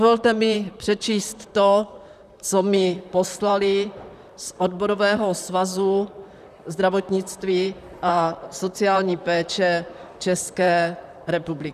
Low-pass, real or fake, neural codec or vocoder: 14.4 kHz; fake; vocoder, 44.1 kHz, 128 mel bands, Pupu-Vocoder